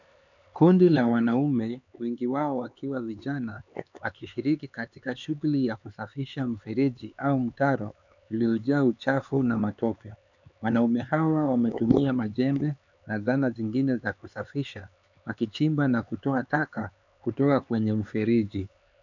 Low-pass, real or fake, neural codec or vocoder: 7.2 kHz; fake; codec, 16 kHz, 4 kbps, X-Codec, HuBERT features, trained on LibriSpeech